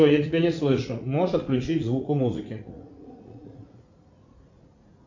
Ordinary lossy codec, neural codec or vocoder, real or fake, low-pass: MP3, 48 kbps; vocoder, 44.1 kHz, 80 mel bands, Vocos; fake; 7.2 kHz